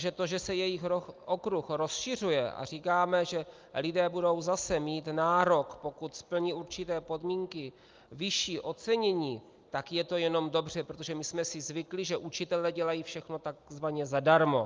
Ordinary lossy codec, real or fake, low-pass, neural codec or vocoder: Opus, 24 kbps; real; 7.2 kHz; none